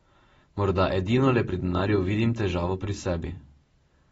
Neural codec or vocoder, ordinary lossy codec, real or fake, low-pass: none; AAC, 24 kbps; real; 19.8 kHz